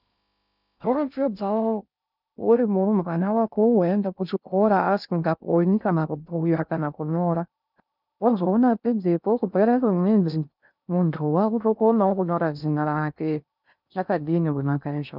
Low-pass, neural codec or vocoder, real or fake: 5.4 kHz; codec, 16 kHz in and 24 kHz out, 0.6 kbps, FocalCodec, streaming, 2048 codes; fake